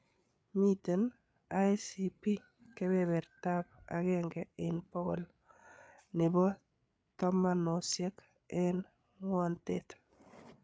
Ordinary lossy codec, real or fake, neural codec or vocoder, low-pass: none; fake; codec, 16 kHz, 8 kbps, FreqCodec, larger model; none